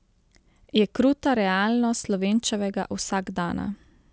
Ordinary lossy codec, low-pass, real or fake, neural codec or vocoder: none; none; real; none